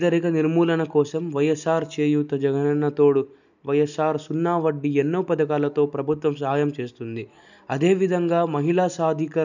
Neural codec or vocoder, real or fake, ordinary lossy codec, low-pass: none; real; none; 7.2 kHz